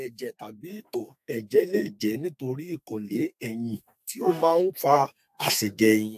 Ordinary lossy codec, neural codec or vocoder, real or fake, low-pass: AAC, 96 kbps; codec, 32 kHz, 1.9 kbps, SNAC; fake; 14.4 kHz